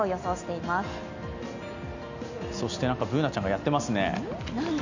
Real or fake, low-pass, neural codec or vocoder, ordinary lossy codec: real; 7.2 kHz; none; none